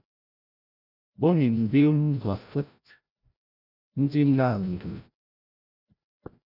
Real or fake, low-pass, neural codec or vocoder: fake; 5.4 kHz; codec, 16 kHz, 0.5 kbps, FreqCodec, larger model